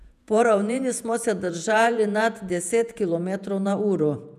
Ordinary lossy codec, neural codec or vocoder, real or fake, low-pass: none; vocoder, 48 kHz, 128 mel bands, Vocos; fake; 14.4 kHz